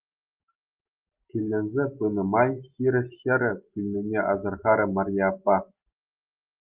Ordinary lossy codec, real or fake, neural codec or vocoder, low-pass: Opus, 24 kbps; real; none; 3.6 kHz